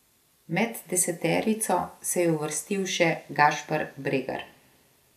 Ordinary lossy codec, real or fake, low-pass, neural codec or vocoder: none; real; 14.4 kHz; none